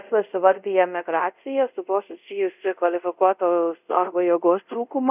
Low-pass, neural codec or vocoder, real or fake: 3.6 kHz; codec, 24 kHz, 0.5 kbps, DualCodec; fake